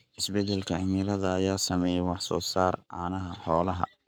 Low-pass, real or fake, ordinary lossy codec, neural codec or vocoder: none; fake; none; codec, 44.1 kHz, 7.8 kbps, Pupu-Codec